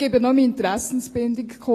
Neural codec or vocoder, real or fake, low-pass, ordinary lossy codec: none; real; 14.4 kHz; AAC, 48 kbps